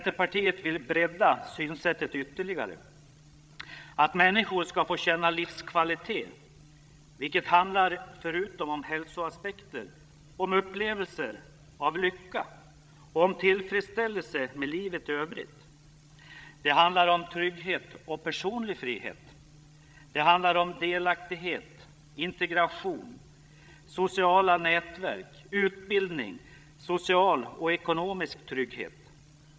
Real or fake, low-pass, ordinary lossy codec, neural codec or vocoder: fake; none; none; codec, 16 kHz, 16 kbps, FreqCodec, larger model